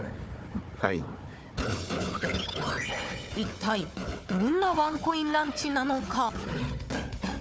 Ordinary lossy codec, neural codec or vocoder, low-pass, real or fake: none; codec, 16 kHz, 4 kbps, FunCodec, trained on Chinese and English, 50 frames a second; none; fake